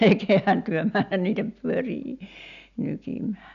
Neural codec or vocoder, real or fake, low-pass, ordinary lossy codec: none; real; 7.2 kHz; none